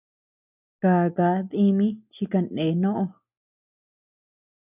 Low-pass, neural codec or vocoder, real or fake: 3.6 kHz; none; real